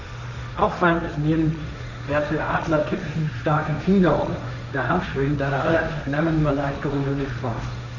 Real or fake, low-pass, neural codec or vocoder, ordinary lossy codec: fake; 7.2 kHz; codec, 16 kHz, 1.1 kbps, Voila-Tokenizer; none